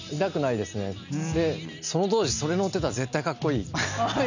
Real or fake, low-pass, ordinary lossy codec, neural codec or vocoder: real; 7.2 kHz; none; none